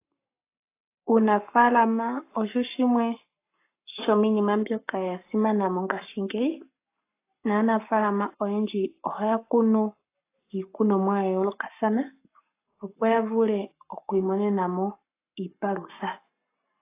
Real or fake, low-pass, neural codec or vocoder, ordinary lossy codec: fake; 3.6 kHz; codec, 44.1 kHz, 7.8 kbps, Pupu-Codec; AAC, 24 kbps